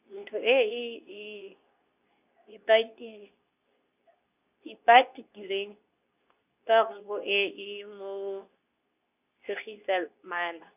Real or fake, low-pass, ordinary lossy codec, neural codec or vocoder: fake; 3.6 kHz; none; codec, 24 kHz, 0.9 kbps, WavTokenizer, medium speech release version 2